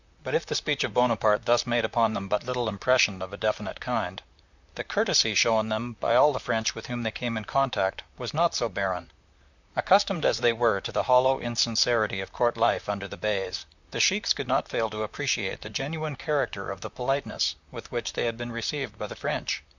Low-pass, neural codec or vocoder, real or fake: 7.2 kHz; vocoder, 44.1 kHz, 128 mel bands, Pupu-Vocoder; fake